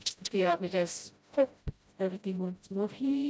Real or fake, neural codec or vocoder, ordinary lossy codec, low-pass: fake; codec, 16 kHz, 0.5 kbps, FreqCodec, smaller model; none; none